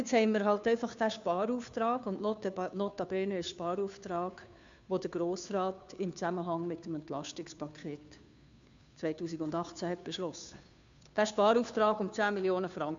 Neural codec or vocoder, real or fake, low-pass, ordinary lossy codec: codec, 16 kHz, 2 kbps, FunCodec, trained on Chinese and English, 25 frames a second; fake; 7.2 kHz; MP3, 64 kbps